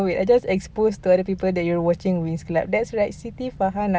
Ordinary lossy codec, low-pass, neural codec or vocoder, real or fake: none; none; none; real